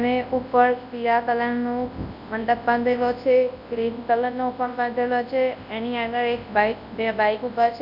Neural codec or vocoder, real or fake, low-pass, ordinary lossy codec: codec, 24 kHz, 0.9 kbps, WavTokenizer, large speech release; fake; 5.4 kHz; none